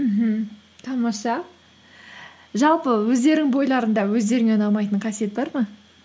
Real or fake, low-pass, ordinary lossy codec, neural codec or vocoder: real; none; none; none